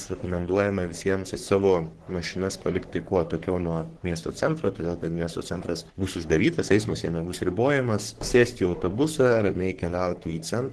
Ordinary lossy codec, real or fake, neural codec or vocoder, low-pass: Opus, 16 kbps; fake; codec, 44.1 kHz, 3.4 kbps, Pupu-Codec; 10.8 kHz